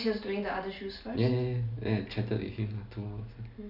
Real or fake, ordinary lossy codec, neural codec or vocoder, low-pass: real; none; none; 5.4 kHz